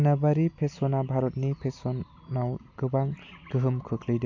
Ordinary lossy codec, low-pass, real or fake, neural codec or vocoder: AAC, 48 kbps; 7.2 kHz; real; none